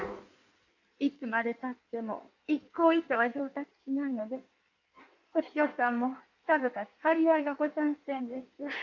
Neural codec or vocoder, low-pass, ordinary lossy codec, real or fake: codec, 16 kHz in and 24 kHz out, 1.1 kbps, FireRedTTS-2 codec; 7.2 kHz; none; fake